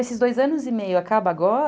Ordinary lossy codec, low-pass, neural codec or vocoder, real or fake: none; none; none; real